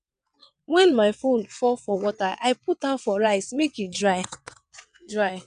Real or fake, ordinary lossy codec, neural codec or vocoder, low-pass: fake; none; vocoder, 22.05 kHz, 80 mel bands, WaveNeXt; 9.9 kHz